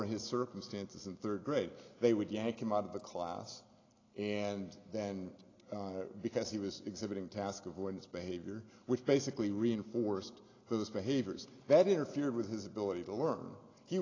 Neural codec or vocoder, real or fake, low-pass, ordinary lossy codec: none; real; 7.2 kHz; AAC, 32 kbps